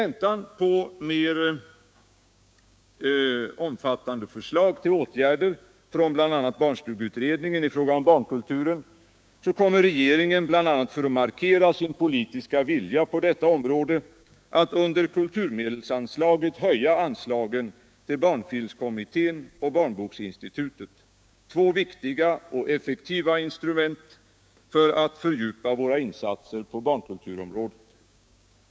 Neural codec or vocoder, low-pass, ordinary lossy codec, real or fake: codec, 16 kHz, 6 kbps, DAC; none; none; fake